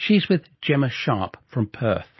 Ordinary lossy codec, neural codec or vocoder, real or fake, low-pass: MP3, 24 kbps; none; real; 7.2 kHz